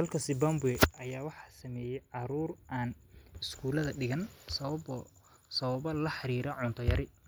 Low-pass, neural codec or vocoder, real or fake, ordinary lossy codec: none; none; real; none